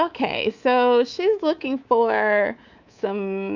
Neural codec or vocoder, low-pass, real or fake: codec, 24 kHz, 3.1 kbps, DualCodec; 7.2 kHz; fake